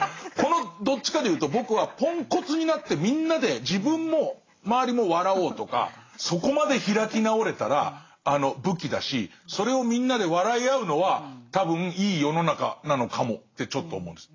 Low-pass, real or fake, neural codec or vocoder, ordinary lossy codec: 7.2 kHz; real; none; AAC, 32 kbps